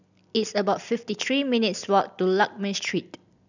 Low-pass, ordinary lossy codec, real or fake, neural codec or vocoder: 7.2 kHz; none; real; none